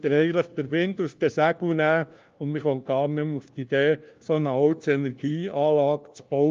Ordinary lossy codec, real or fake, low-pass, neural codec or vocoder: Opus, 32 kbps; fake; 7.2 kHz; codec, 16 kHz, 1 kbps, FunCodec, trained on LibriTTS, 50 frames a second